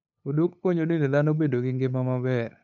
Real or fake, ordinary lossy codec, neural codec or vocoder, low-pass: fake; none; codec, 16 kHz, 8 kbps, FunCodec, trained on LibriTTS, 25 frames a second; 7.2 kHz